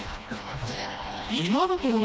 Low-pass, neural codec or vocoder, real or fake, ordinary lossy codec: none; codec, 16 kHz, 1 kbps, FreqCodec, smaller model; fake; none